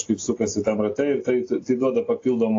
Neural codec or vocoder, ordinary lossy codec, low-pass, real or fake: none; AAC, 32 kbps; 7.2 kHz; real